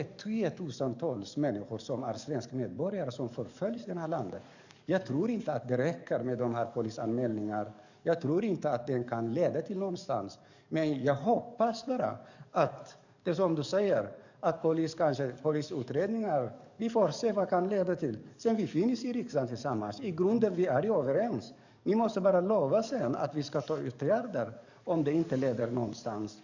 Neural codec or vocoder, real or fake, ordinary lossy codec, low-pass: codec, 44.1 kHz, 7.8 kbps, DAC; fake; none; 7.2 kHz